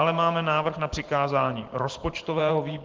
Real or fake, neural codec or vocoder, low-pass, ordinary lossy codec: fake; vocoder, 44.1 kHz, 128 mel bands every 512 samples, BigVGAN v2; 7.2 kHz; Opus, 16 kbps